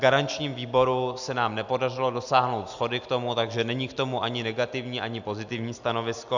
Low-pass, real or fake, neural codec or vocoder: 7.2 kHz; real; none